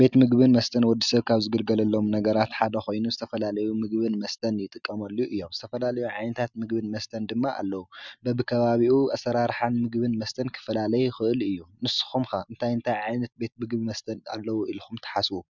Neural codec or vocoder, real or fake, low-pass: none; real; 7.2 kHz